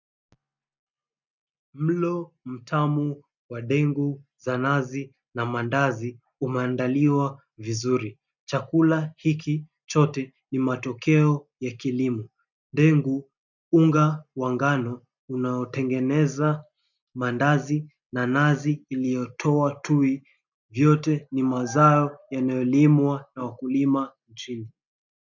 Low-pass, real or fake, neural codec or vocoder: 7.2 kHz; real; none